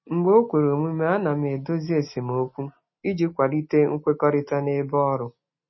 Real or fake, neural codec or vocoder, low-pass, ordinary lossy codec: real; none; 7.2 kHz; MP3, 24 kbps